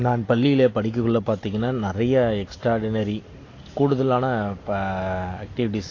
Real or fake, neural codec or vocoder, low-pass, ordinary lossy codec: real; none; 7.2 kHz; AAC, 32 kbps